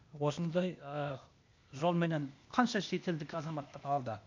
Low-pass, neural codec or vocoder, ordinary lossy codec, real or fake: 7.2 kHz; codec, 16 kHz, 0.8 kbps, ZipCodec; MP3, 48 kbps; fake